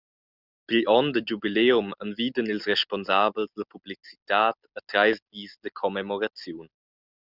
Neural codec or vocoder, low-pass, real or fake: none; 5.4 kHz; real